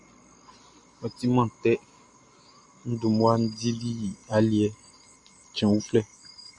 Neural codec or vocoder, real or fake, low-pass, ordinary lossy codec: none; real; 10.8 kHz; Opus, 64 kbps